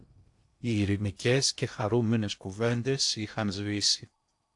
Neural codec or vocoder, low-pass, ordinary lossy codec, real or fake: codec, 16 kHz in and 24 kHz out, 0.8 kbps, FocalCodec, streaming, 65536 codes; 10.8 kHz; AAC, 64 kbps; fake